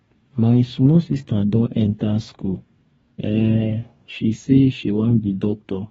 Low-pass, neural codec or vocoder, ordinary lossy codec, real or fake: 19.8 kHz; codec, 44.1 kHz, 2.6 kbps, DAC; AAC, 24 kbps; fake